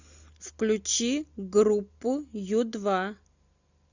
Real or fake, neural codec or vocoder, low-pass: real; none; 7.2 kHz